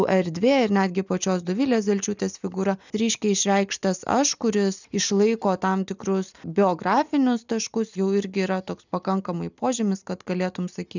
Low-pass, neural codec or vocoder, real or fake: 7.2 kHz; none; real